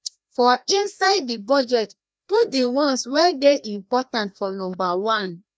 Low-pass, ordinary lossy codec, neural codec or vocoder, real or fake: none; none; codec, 16 kHz, 1 kbps, FreqCodec, larger model; fake